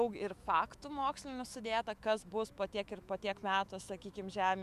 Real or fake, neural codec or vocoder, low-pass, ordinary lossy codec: fake; autoencoder, 48 kHz, 128 numbers a frame, DAC-VAE, trained on Japanese speech; 14.4 kHz; Opus, 64 kbps